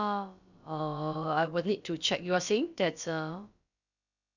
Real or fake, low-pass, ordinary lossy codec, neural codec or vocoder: fake; 7.2 kHz; none; codec, 16 kHz, about 1 kbps, DyCAST, with the encoder's durations